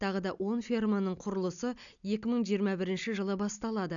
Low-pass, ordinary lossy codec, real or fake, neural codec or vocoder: 7.2 kHz; none; real; none